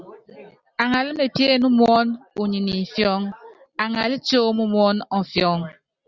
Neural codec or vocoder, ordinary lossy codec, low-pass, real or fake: none; Opus, 64 kbps; 7.2 kHz; real